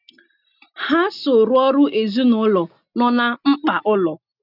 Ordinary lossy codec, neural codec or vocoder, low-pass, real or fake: none; none; 5.4 kHz; real